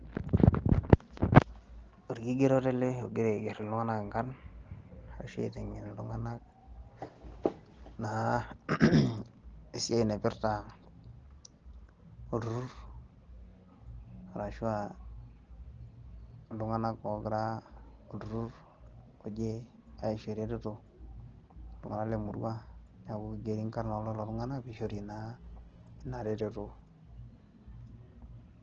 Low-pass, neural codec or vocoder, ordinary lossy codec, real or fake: 7.2 kHz; none; Opus, 16 kbps; real